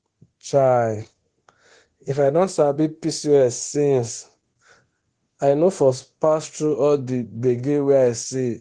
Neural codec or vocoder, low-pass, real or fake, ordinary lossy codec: codec, 24 kHz, 0.9 kbps, DualCodec; 9.9 kHz; fake; Opus, 16 kbps